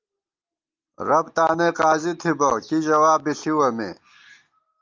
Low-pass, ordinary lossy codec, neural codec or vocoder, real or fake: 7.2 kHz; Opus, 24 kbps; none; real